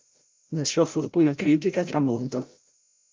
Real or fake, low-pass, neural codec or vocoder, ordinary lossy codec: fake; 7.2 kHz; codec, 16 kHz, 0.5 kbps, FreqCodec, larger model; Opus, 32 kbps